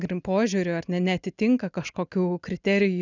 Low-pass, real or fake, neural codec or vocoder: 7.2 kHz; real; none